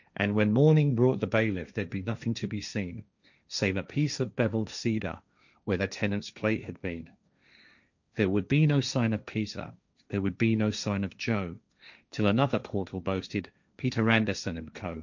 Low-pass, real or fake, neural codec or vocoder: 7.2 kHz; fake; codec, 16 kHz, 1.1 kbps, Voila-Tokenizer